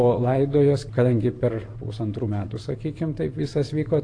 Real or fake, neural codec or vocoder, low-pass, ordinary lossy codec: fake; vocoder, 44.1 kHz, 128 mel bands every 512 samples, BigVGAN v2; 9.9 kHz; AAC, 48 kbps